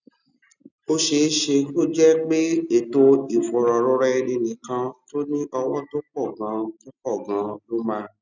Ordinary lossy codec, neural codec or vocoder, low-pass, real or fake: none; none; 7.2 kHz; real